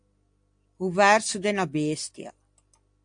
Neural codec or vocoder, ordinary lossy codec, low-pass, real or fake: none; AAC, 64 kbps; 9.9 kHz; real